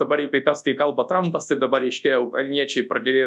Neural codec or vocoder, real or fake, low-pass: codec, 24 kHz, 0.9 kbps, WavTokenizer, large speech release; fake; 10.8 kHz